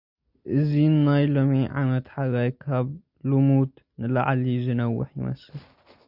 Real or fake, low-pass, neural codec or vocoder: real; 5.4 kHz; none